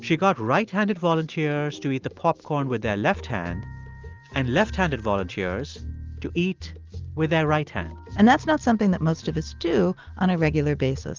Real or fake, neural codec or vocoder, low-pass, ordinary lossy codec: real; none; 7.2 kHz; Opus, 32 kbps